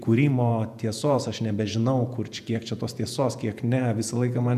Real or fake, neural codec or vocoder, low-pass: fake; vocoder, 48 kHz, 128 mel bands, Vocos; 14.4 kHz